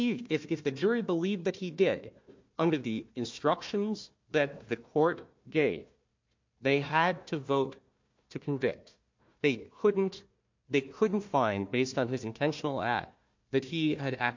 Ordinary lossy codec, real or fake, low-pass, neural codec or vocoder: MP3, 48 kbps; fake; 7.2 kHz; codec, 16 kHz, 1 kbps, FunCodec, trained on Chinese and English, 50 frames a second